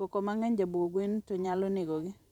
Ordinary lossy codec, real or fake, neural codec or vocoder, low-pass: none; fake; vocoder, 44.1 kHz, 128 mel bands every 512 samples, BigVGAN v2; 19.8 kHz